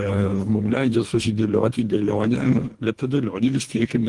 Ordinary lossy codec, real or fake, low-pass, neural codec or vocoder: Opus, 24 kbps; fake; 10.8 kHz; codec, 24 kHz, 1.5 kbps, HILCodec